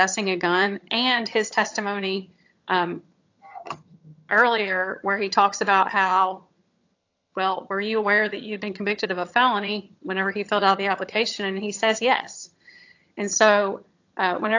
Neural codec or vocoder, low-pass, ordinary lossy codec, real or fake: vocoder, 22.05 kHz, 80 mel bands, HiFi-GAN; 7.2 kHz; AAC, 48 kbps; fake